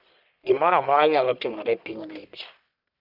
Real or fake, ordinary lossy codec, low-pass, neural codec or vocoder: fake; none; 5.4 kHz; codec, 44.1 kHz, 1.7 kbps, Pupu-Codec